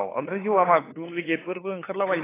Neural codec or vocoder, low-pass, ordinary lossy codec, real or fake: codec, 16 kHz, 2 kbps, FunCodec, trained on LibriTTS, 25 frames a second; 3.6 kHz; AAC, 16 kbps; fake